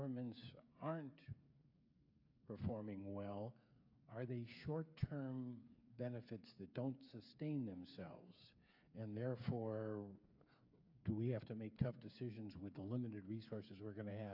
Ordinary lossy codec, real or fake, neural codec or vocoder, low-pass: AAC, 48 kbps; fake; codec, 16 kHz, 8 kbps, FreqCodec, smaller model; 5.4 kHz